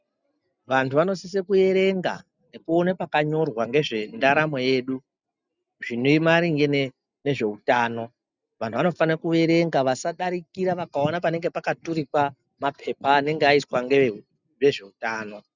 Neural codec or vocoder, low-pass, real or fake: none; 7.2 kHz; real